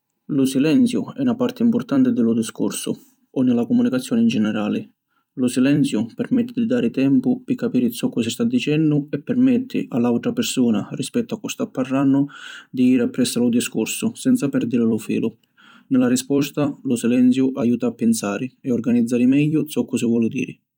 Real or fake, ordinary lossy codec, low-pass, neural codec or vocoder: fake; none; 19.8 kHz; vocoder, 44.1 kHz, 128 mel bands every 256 samples, BigVGAN v2